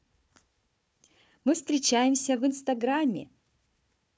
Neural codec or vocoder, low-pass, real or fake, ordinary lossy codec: codec, 16 kHz, 4 kbps, FunCodec, trained on Chinese and English, 50 frames a second; none; fake; none